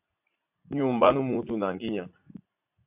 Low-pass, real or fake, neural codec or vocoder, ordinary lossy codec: 3.6 kHz; fake; vocoder, 44.1 kHz, 80 mel bands, Vocos; AAC, 32 kbps